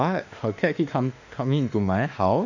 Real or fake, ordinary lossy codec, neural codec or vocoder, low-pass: fake; none; autoencoder, 48 kHz, 32 numbers a frame, DAC-VAE, trained on Japanese speech; 7.2 kHz